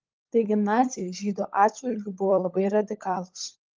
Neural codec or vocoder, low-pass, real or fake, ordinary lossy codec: codec, 16 kHz, 16 kbps, FunCodec, trained on LibriTTS, 50 frames a second; 7.2 kHz; fake; Opus, 32 kbps